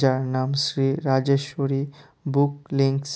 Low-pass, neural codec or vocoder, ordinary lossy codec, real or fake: none; none; none; real